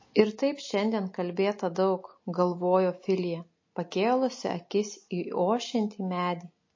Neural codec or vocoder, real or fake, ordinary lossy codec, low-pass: none; real; MP3, 32 kbps; 7.2 kHz